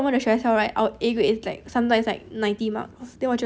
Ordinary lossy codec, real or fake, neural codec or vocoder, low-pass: none; real; none; none